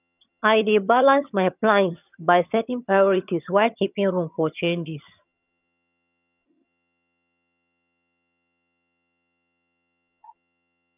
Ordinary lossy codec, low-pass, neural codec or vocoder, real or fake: none; 3.6 kHz; vocoder, 22.05 kHz, 80 mel bands, HiFi-GAN; fake